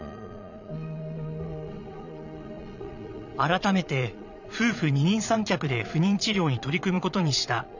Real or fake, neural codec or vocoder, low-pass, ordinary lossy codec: fake; vocoder, 22.05 kHz, 80 mel bands, Vocos; 7.2 kHz; none